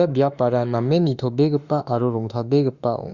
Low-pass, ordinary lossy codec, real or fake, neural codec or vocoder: 7.2 kHz; Opus, 64 kbps; fake; autoencoder, 48 kHz, 32 numbers a frame, DAC-VAE, trained on Japanese speech